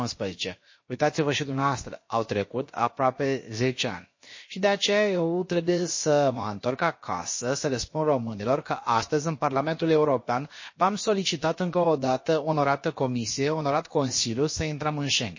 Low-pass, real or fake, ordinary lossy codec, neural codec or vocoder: 7.2 kHz; fake; MP3, 32 kbps; codec, 16 kHz, about 1 kbps, DyCAST, with the encoder's durations